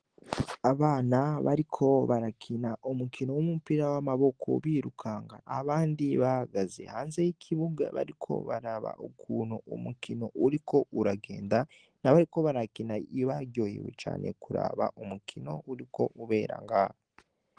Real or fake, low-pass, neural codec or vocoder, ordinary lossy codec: real; 9.9 kHz; none; Opus, 16 kbps